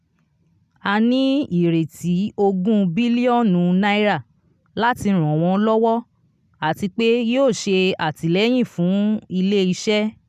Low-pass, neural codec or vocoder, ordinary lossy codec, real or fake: 14.4 kHz; none; none; real